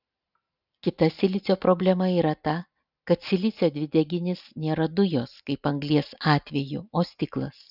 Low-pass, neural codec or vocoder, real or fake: 5.4 kHz; none; real